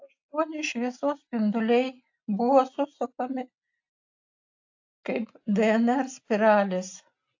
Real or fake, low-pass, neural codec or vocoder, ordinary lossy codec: real; 7.2 kHz; none; AAC, 48 kbps